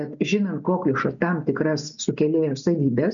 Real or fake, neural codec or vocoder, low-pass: real; none; 7.2 kHz